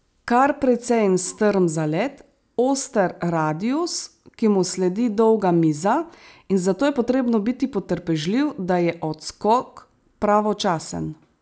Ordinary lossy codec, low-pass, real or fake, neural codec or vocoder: none; none; real; none